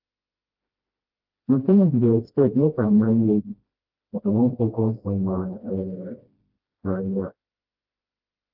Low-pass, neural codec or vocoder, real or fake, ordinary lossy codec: 5.4 kHz; codec, 16 kHz, 1 kbps, FreqCodec, smaller model; fake; Opus, 32 kbps